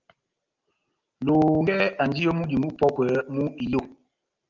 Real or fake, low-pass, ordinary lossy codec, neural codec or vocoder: real; 7.2 kHz; Opus, 24 kbps; none